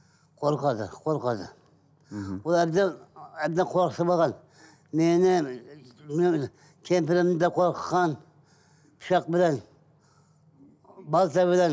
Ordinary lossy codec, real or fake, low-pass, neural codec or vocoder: none; real; none; none